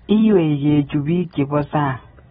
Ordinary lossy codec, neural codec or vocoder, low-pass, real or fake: AAC, 16 kbps; none; 19.8 kHz; real